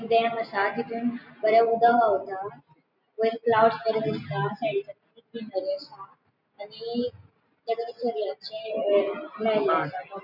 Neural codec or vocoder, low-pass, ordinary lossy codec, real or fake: none; 5.4 kHz; none; real